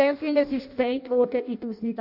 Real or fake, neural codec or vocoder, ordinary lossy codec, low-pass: fake; codec, 16 kHz in and 24 kHz out, 0.6 kbps, FireRedTTS-2 codec; AAC, 48 kbps; 5.4 kHz